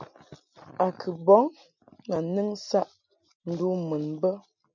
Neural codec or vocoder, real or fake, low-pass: none; real; 7.2 kHz